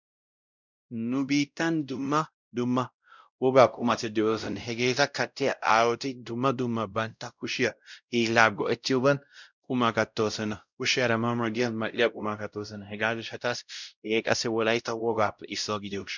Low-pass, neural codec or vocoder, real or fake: 7.2 kHz; codec, 16 kHz, 0.5 kbps, X-Codec, WavLM features, trained on Multilingual LibriSpeech; fake